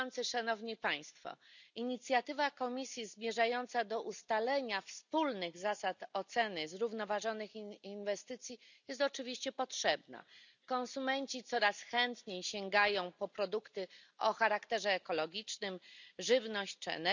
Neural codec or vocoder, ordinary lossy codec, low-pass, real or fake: none; none; 7.2 kHz; real